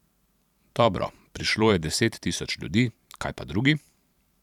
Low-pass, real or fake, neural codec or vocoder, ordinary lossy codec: 19.8 kHz; fake; vocoder, 44.1 kHz, 128 mel bands every 512 samples, BigVGAN v2; none